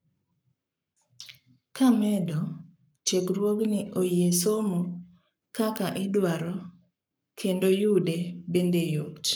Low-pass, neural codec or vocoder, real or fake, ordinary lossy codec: none; codec, 44.1 kHz, 7.8 kbps, Pupu-Codec; fake; none